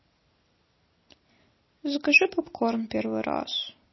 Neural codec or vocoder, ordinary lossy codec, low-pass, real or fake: none; MP3, 24 kbps; 7.2 kHz; real